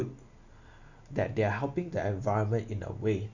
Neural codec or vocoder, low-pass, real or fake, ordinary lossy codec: none; 7.2 kHz; real; none